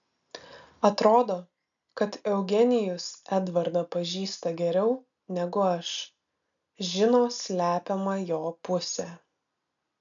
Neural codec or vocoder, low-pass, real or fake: none; 7.2 kHz; real